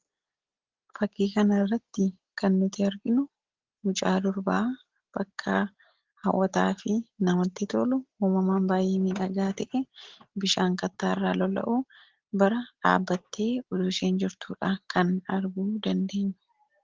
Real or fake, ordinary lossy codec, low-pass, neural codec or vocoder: real; Opus, 16 kbps; 7.2 kHz; none